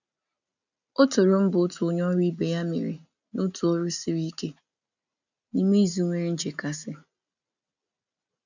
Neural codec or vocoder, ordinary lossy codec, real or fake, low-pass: none; none; real; 7.2 kHz